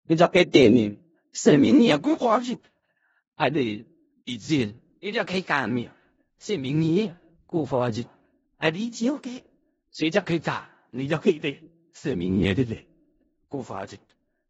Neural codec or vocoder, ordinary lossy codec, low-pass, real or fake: codec, 16 kHz in and 24 kHz out, 0.4 kbps, LongCat-Audio-Codec, four codebook decoder; AAC, 24 kbps; 10.8 kHz; fake